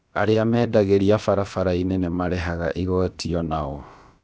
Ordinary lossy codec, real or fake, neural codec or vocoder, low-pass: none; fake; codec, 16 kHz, about 1 kbps, DyCAST, with the encoder's durations; none